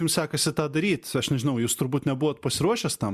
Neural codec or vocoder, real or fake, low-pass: none; real; 14.4 kHz